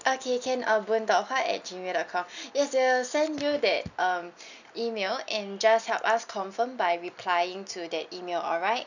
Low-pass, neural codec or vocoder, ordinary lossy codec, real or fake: 7.2 kHz; none; none; real